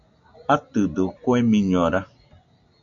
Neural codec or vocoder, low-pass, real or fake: none; 7.2 kHz; real